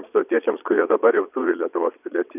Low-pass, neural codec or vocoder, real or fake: 3.6 kHz; vocoder, 22.05 kHz, 80 mel bands, Vocos; fake